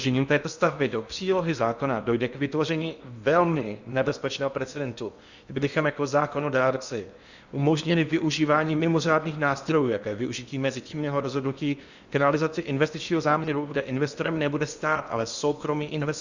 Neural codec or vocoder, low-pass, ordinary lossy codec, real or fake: codec, 16 kHz in and 24 kHz out, 0.8 kbps, FocalCodec, streaming, 65536 codes; 7.2 kHz; Opus, 64 kbps; fake